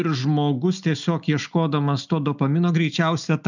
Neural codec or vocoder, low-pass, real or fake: none; 7.2 kHz; real